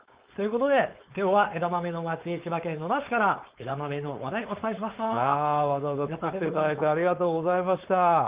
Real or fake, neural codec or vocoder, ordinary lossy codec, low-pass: fake; codec, 16 kHz, 4.8 kbps, FACodec; Opus, 32 kbps; 3.6 kHz